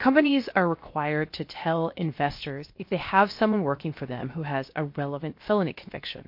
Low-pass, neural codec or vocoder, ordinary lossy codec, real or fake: 5.4 kHz; codec, 16 kHz, 0.7 kbps, FocalCodec; MP3, 32 kbps; fake